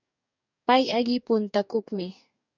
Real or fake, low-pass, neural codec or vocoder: fake; 7.2 kHz; codec, 44.1 kHz, 2.6 kbps, DAC